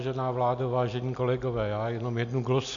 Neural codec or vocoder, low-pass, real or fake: none; 7.2 kHz; real